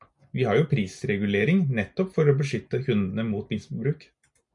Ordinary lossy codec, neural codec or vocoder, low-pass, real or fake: MP3, 64 kbps; none; 10.8 kHz; real